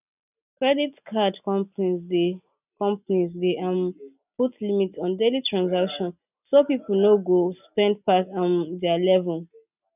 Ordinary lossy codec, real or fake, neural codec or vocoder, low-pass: none; real; none; 3.6 kHz